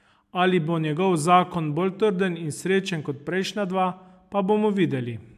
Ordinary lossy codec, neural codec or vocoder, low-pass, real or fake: AAC, 96 kbps; none; 14.4 kHz; real